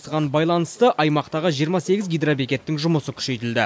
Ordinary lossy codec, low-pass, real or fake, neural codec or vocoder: none; none; real; none